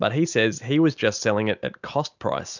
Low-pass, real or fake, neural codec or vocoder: 7.2 kHz; real; none